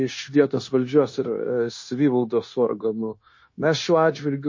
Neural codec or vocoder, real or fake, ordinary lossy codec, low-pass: codec, 16 kHz, 0.9 kbps, LongCat-Audio-Codec; fake; MP3, 32 kbps; 7.2 kHz